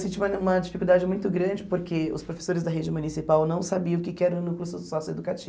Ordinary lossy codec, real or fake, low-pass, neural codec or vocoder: none; real; none; none